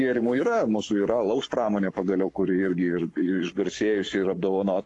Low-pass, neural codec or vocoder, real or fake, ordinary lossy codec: 10.8 kHz; codec, 44.1 kHz, 7.8 kbps, Pupu-Codec; fake; AAC, 48 kbps